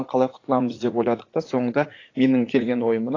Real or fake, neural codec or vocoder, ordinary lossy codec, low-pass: fake; vocoder, 44.1 kHz, 80 mel bands, Vocos; AAC, 32 kbps; 7.2 kHz